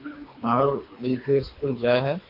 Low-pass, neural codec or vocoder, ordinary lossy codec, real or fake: 5.4 kHz; codec, 24 kHz, 3 kbps, HILCodec; MP3, 32 kbps; fake